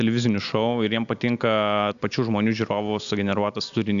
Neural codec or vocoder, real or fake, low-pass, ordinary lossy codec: none; real; 7.2 kHz; MP3, 96 kbps